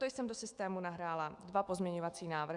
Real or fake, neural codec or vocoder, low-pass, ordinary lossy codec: fake; autoencoder, 48 kHz, 128 numbers a frame, DAC-VAE, trained on Japanese speech; 10.8 kHz; Opus, 64 kbps